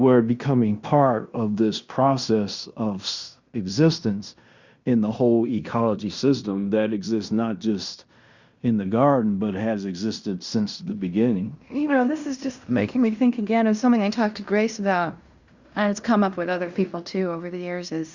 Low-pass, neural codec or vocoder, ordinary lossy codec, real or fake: 7.2 kHz; codec, 16 kHz in and 24 kHz out, 0.9 kbps, LongCat-Audio-Codec, fine tuned four codebook decoder; Opus, 64 kbps; fake